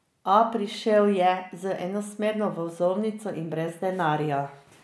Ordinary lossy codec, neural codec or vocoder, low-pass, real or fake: none; none; none; real